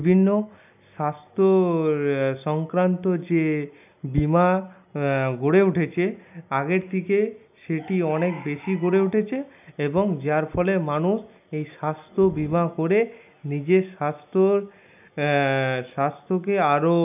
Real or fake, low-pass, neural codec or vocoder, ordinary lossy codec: real; 3.6 kHz; none; none